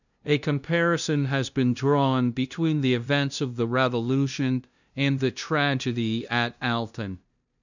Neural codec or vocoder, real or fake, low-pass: codec, 16 kHz, 0.5 kbps, FunCodec, trained on LibriTTS, 25 frames a second; fake; 7.2 kHz